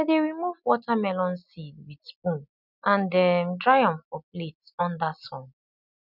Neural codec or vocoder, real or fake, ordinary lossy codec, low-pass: none; real; none; 5.4 kHz